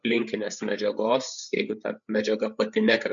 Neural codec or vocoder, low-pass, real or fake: codec, 16 kHz, 8 kbps, FreqCodec, larger model; 7.2 kHz; fake